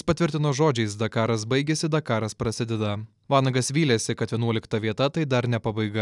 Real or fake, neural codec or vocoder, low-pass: real; none; 10.8 kHz